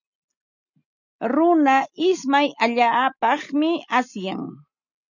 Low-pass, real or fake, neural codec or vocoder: 7.2 kHz; real; none